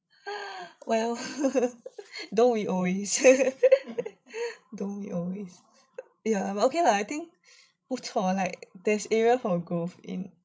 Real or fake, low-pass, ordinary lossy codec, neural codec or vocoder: fake; none; none; codec, 16 kHz, 16 kbps, FreqCodec, larger model